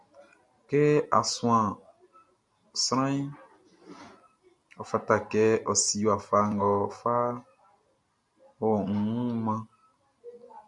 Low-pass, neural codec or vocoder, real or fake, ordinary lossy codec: 10.8 kHz; none; real; MP3, 96 kbps